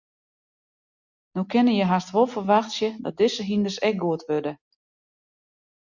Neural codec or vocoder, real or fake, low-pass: none; real; 7.2 kHz